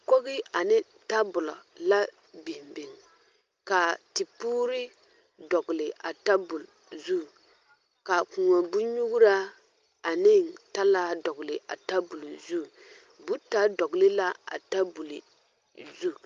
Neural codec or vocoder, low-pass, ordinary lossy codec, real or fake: none; 7.2 kHz; Opus, 32 kbps; real